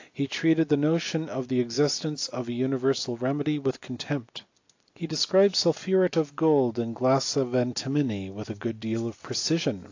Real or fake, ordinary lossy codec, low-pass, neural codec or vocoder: real; AAC, 48 kbps; 7.2 kHz; none